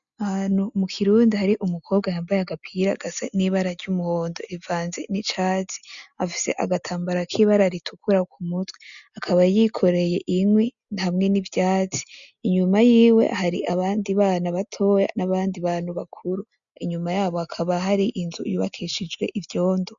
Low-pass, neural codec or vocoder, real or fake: 7.2 kHz; none; real